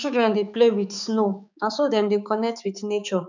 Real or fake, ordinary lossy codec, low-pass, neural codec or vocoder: fake; none; 7.2 kHz; codec, 16 kHz, 4 kbps, X-Codec, HuBERT features, trained on balanced general audio